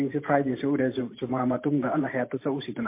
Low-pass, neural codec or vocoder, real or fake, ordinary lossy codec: 3.6 kHz; vocoder, 44.1 kHz, 128 mel bands every 512 samples, BigVGAN v2; fake; MP3, 24 kbps